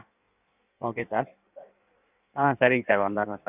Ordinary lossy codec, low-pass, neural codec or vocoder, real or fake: none; 3.6 kHz; codec, 16 kHz in and 24 kHz out, 1.1 kbps, FireRedTTS-2 codec; fake